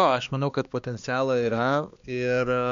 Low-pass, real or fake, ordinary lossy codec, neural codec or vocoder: 7.2 kHz; fake; MP3, 64 kbps; codec, 16 kHz, 2 kbps, X-Codec, HuBERT features, trained on balanced general audio